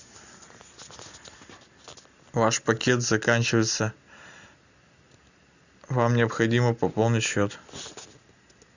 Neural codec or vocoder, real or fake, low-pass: none; real; 7.2 kHz